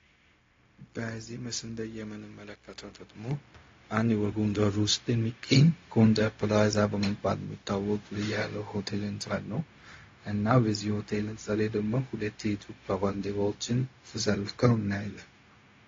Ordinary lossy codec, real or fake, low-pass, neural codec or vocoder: AAC, 32 kbps; fake; 7.2 kHz; codec, 16 kHz, 0.4 kbps, LongCat-Audio-Codec